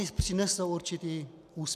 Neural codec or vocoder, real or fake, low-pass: none; real; 14.4 kHz